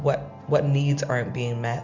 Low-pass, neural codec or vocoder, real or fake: 7.2 kHz; none; real